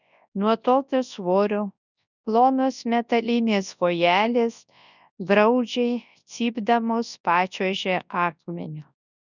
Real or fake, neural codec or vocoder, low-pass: fake; codec, 24 kHz, 0.9 kbps, WavTokenizer, large speech release; 7.2 kHz